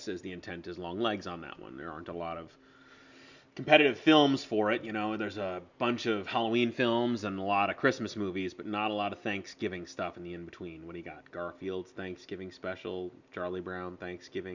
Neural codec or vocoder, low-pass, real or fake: none; 7.2 kHz; real